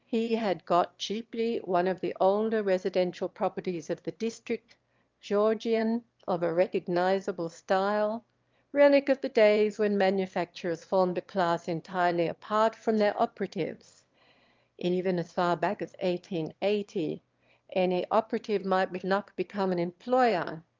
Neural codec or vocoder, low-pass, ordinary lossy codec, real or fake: autoencoder, 22.05 kHz, a latent of 192 numbers a frame, VITS, trained on one speaker; 7.2 kHz; Opus, 32 kbps; fake